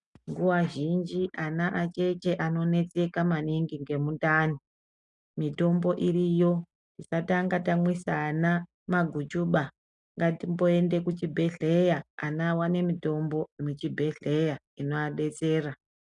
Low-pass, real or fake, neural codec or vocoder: 10.8 kHz; real; none